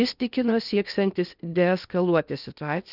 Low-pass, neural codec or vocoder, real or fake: 5.4 kHz; codec, 16 kHz in and 24 kHz out, 0.8 kbps, FocalCodec, streaming, 65536 codes; fake